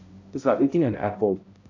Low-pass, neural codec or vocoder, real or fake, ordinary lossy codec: 7.2 kHz; codec, 16 kHz, 0.5 kbps, X-Codec, HuBERT features, trained on balanced general audio; fake; AAC, 48 kbps